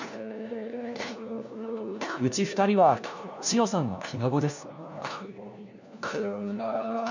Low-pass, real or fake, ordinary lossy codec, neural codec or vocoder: 7.2 kHz; fake; none; codec, 16 kHz, 1 kbps, FunCodec, trained on LibriTTS, 50 frames a second